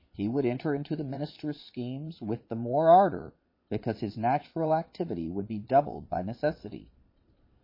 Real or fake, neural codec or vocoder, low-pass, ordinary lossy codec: fake; vocoder, 22.05 kHz, 80 mel bands, Vocos; 5.4 kHz; MP3, 24 kbps